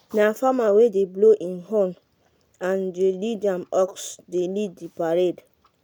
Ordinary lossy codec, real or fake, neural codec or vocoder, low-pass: none; real; none; none